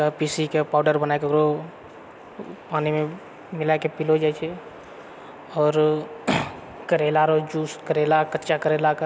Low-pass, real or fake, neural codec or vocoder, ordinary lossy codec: none; real; none; none